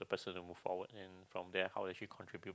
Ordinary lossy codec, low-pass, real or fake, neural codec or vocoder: none; none; real; none